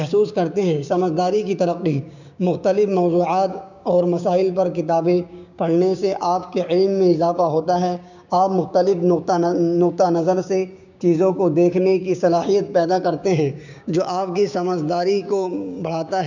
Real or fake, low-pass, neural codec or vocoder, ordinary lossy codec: fake; 7.2 kHz; codec, 16 kHz, 6 kbps, DAC; none